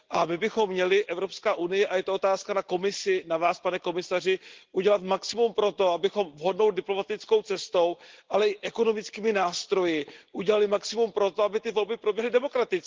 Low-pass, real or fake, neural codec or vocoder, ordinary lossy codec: 7.2 kHz; real; none; Opus, 16 kbps